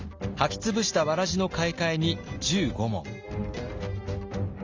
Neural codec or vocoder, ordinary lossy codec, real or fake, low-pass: none; Opus, 32 kbps; real; 7.2 kHz